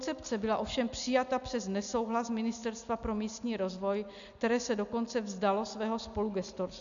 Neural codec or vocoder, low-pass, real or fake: none; 7.2 kHz; real